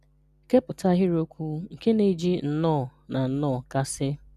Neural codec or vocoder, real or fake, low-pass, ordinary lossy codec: none; real; 14.4 kHz; none